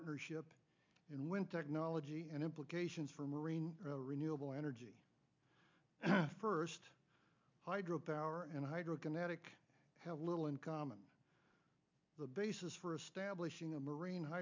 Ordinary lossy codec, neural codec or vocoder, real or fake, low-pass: MP3, 64 kbps; none; real; 7.2 kHz